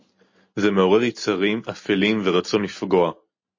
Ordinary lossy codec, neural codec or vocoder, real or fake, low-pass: MP3, 32 kbps; none; real; 7.2 kHz